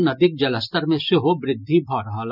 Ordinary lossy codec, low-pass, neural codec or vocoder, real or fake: none; 5.4 kHz; none; real